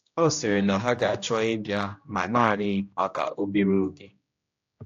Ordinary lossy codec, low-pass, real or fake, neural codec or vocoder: AAC, 48 kbps; 7.2 kHz; fake; codec, 16 kHz, 1 kbps, X-Codec, HuBERT features, trained on general audio